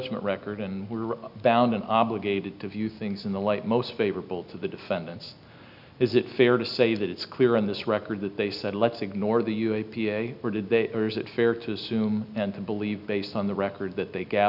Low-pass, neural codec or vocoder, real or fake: 5.4 kHz; none; real